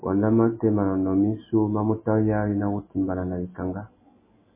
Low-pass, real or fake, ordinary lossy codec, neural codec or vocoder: 3.6 kHz; real; MP3, 16 kbps; none